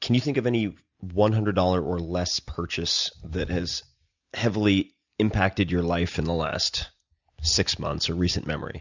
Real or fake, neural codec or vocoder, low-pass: real; none; 7.2 kHz